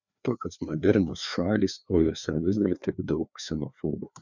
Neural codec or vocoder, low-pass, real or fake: codec, 16 kHz, 2 kbps, FreqCodec, larger model; 7.2 kHz; fake